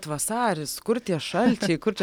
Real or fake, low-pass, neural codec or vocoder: real; 19.8 kHz; none